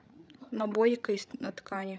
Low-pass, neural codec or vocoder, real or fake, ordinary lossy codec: none; codec, 16 kHz, 16 kbps, FreqCodec, larger model; fake; none